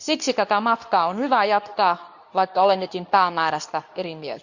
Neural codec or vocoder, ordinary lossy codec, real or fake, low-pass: codec, 24 kHz, 0.9 kbps, WavTokenizer, medium speech release version 2; none; fake; 7.2 kHz